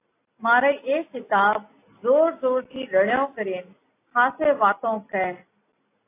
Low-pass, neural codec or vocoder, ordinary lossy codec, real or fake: 3.6 kHz; none; AAC, 24 kbps; real